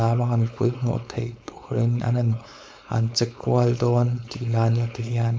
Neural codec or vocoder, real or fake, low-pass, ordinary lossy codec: codec, 16 kHz, 4.8 kbps, FACodec; fake; none; none